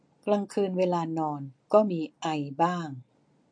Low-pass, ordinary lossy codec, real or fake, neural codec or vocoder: 9.9 kHz; MP3, 48 kbps; real; none